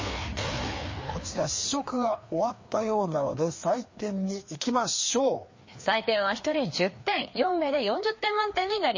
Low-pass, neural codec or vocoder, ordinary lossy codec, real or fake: 7.2 kHz; codec, 16 kHz, 2 kbps, FreqCodec, larger model; MP3, 32 kbps; fake